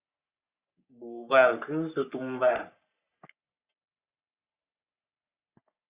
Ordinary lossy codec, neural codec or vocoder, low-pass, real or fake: Opus, 64 kbps; codec, 44.1 kHz, 3.4 kbps, Pupu-Codec; 3.6 kHz; fake